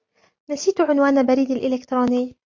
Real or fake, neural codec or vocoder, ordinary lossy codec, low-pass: real; none; MP3, 48 kbps; 7.2 kHz